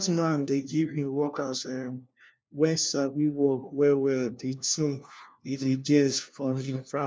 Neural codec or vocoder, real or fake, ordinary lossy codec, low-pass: codec, 16 kHz, 1 kbps, FunCodec, trained on LibriTTS, 50 frames a second; fake; none; none